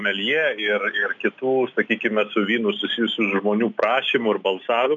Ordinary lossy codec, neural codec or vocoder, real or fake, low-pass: AAC, 64 kbps; none; real; 7.2 kHz